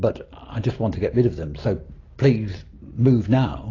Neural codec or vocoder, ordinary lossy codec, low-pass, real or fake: none; AAC, 32 kbps; 7.2 kHz; real